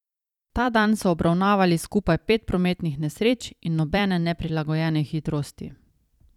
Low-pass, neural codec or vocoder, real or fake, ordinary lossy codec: 19.8 kHz; vocoder, 44.1 kHz, 128 mel bands every 256 samples, BigVGAN v2; fake; none